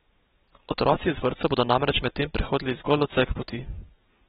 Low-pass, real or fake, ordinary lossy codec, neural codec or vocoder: 10.8 kHz; real; AAC, 16 kbps; none